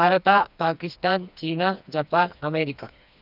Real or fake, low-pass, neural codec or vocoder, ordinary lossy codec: fake; 5.4 kHz; codec, 16 kHz, 2 kbps, FreqCodec, smaller model; none